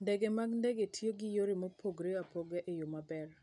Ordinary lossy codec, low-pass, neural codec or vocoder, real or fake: none; 10.8 kHz; none; real